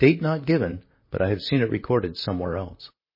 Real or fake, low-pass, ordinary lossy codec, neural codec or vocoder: fake; 5.4 kHz; MP3, 24 kbps; vocoder, 44.1 kHz, 128 mel bands, Pupu-Vocoder